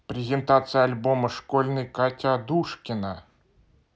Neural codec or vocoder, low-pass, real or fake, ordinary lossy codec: none; none; real; none